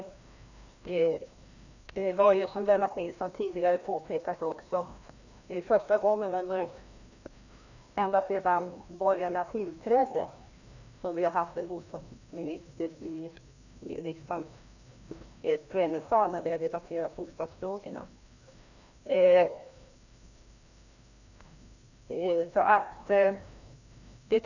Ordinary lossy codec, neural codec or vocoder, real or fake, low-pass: none; codec, 16 kHz, 1 kbps, FreqCodec, larger model; fake; 7.2 kHz